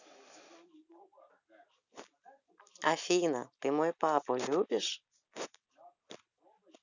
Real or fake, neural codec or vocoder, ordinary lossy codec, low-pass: real; none; none; 7.2 kHz